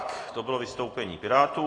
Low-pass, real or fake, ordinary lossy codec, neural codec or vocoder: 9.9 kHz; real; AAC, 32 kbps; none